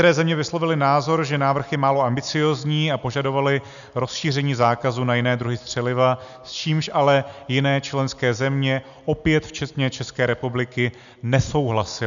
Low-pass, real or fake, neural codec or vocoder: 7.2 kHz; real; none